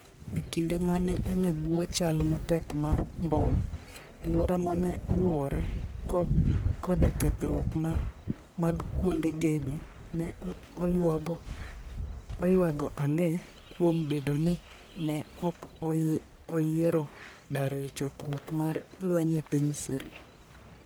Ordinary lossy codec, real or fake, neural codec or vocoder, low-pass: none; fake; codec, 44.1 kHz, 1.7 kbps, Pupu-Codec; none